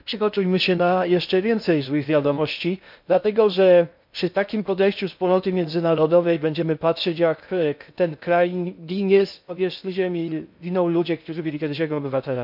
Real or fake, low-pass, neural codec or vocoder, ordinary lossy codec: fake; 5.4 kHz; codec, 16 kHz in and 24 kHz out, 0.6 kbps, FocalCodec, streaming, 2048 codes; MP3, 48 kbps